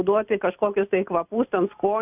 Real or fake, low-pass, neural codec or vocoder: real; 3.6 kHz; none